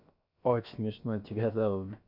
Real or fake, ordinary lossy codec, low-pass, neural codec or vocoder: fake; none; 5.4 kHz; codec, 16 kHz, about 1 kbps, DyCAST, with the encoder's durations